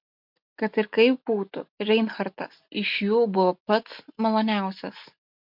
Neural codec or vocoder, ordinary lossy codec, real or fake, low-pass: none; MP3, 48 kbps; real; 5.4 kHz